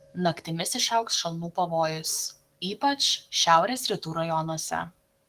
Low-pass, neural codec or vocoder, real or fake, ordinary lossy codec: 14.4 kHz; codec, 44.1 kHz, 7.8 kbps, DAC; fake; Opus, 24 kbps